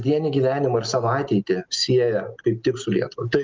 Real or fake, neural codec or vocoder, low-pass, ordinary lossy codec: real; none; 7.2 kHz; Opus, 24 kbps